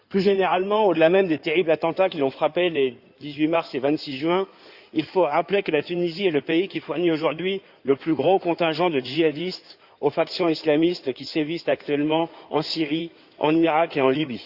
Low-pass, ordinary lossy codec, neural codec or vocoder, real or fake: 5.4 kHz; Opus, 64 kbps; codec, 16 kHz in and 24 kHz out, 2.2 kbps, FireRedTTS-2 codec; fake